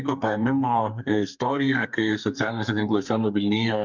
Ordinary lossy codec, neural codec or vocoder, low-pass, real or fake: MP3, 64 kbps; codec, 32 kHz, 1.9 kbps, SNAC; 7.2 kHz; fake